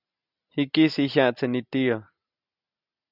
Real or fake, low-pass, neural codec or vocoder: real; 5.4 kHz; none